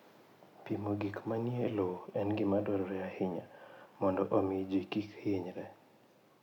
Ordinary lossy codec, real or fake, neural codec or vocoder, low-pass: none; real; none; 19.8 kHz